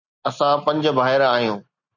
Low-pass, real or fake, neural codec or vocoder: 7.2 kHz; real; none